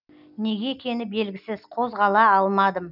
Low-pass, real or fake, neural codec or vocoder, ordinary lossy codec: 5.4 kHz; real; none; none